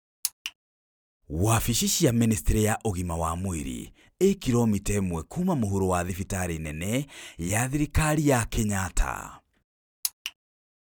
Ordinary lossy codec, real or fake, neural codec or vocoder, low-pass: none; real; none; none